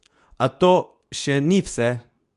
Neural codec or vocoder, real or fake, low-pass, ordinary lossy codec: codec, 24 kHz, 0.9 kbps, WavTokenizer, medium speech release version 2; fake; 10.8 kHz; none